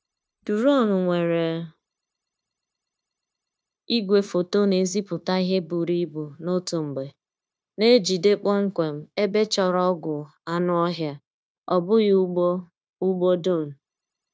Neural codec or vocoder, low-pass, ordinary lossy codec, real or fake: codec, 16 kHz, 0.9 kbps, LongCat-Audio-Codec; none; none; fake